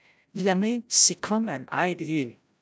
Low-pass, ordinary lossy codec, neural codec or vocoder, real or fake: none; none; codec, 16 kHz, 0.5 kbps, FreqCodec, larger model; fake